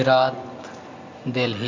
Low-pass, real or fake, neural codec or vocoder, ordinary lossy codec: 7.2 kHz; real; none; MP3, 64 kbps